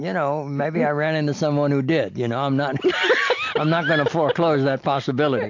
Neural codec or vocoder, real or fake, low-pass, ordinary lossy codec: none; real; 7.2 kHz; AAC, 48 kbps